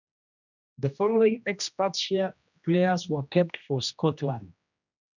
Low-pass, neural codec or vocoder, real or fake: 7.2 kHz; codec, 16 kHz, 1 kbps, X-Codec, HuBERT features, trained on general audio; fake